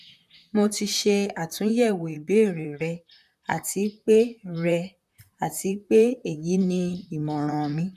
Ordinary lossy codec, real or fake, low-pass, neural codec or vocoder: none; fake; 14.4 kHz; vocoder, 44.1 kHz, 128 mel bands, Pupu-Vocoder